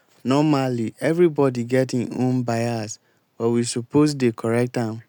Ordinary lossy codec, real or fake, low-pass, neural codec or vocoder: none; real; none; none